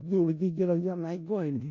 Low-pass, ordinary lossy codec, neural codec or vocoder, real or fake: 7.2 kHz; MP3, 48 kbps; codec, 16 kHz in and 24 kHz out, 0.4 kbps, LongCat-Audio-Codec, four codebook decoder; fake